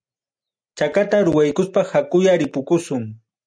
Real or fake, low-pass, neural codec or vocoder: real; 9.9 kHz; none